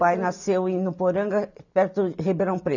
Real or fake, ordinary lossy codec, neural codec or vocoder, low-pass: real; none; none; 7.2 kHz